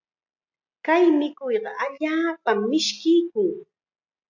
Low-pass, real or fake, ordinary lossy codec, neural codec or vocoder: 7.2 kHz; real; MP3, 64 kbps; none